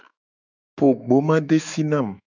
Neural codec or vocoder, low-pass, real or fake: autoencoder, 48 kHz, 128 numbers a frame, DAC-VAE, trained on Japanese speech; 7.2 kHz; fake